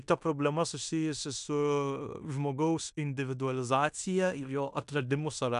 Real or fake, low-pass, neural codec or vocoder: fake; 10.8 kHz; codec, 16 kHz in and 24 kHz out, 0.9 kbps, LongCat-Audio-Codec, fine tuned four codebook decoder